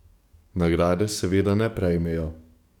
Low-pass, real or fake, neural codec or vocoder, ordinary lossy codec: 19.8 kHz; fake; codec, 44.1 kHz, 7.8 kbps, DAC; none